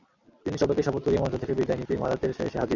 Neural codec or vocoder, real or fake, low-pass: none; real; 7.2 kHz